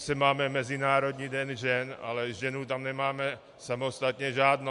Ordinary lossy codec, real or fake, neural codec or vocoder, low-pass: MP3, 64 kbps; real; none; 10.8 kHz